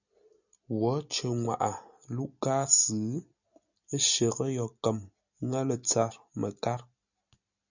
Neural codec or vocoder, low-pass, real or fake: none; 7.2 kHz; real